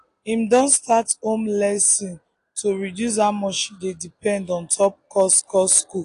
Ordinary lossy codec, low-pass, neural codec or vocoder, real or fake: AAC, 48 kbps; 10.8 kHz; none; real